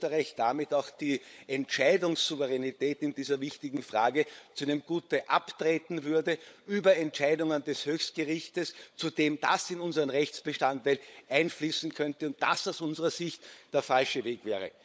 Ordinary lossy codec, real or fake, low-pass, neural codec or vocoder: none; fake; none; codec, 16 kHz, 16 kbps, FunCodec, trained on LibriTTS, 50 frames a second